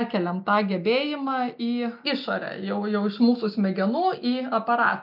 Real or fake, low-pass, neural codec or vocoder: real; 5.4 kHz; none